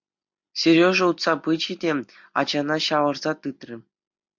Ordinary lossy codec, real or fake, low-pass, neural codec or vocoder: MP3, 64 kbps; real; 7.2 kHz; none